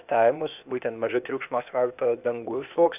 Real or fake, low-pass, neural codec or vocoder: fake; 3.6 kHz; codec, 16 kHz, 0.8 kbps, ZipCodec